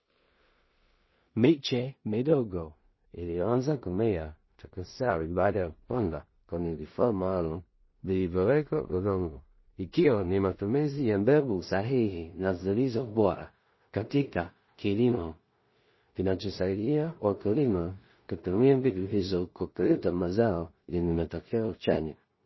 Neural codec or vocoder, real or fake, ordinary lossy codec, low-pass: codec, 16 kHz in and 24 kHz out, 0.4 kbps, LongCat-Audio-Codec, two codebook decoder; fake; MP3, 24 kbps; 7.2 kHz